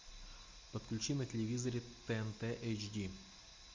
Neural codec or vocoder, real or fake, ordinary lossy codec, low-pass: none; real; MP3, 48 kbps; 7.2 kHz